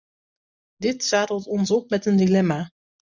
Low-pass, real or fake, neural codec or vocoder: 7.2 kHz; real; none